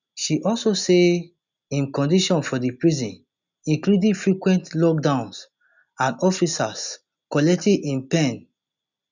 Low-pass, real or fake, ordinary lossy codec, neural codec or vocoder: 7.2 kHz; real; none; none